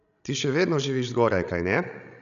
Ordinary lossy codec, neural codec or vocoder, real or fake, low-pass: none; codec, 16 kHz, 16 kbps, FreqCodec, larger model; fake; 7.2 kHz